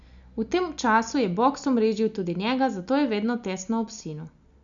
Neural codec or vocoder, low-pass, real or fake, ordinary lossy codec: none; 7.2 kHz; real; none